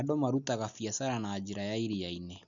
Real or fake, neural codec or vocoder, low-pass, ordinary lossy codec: real; none; 7.2 kHz; none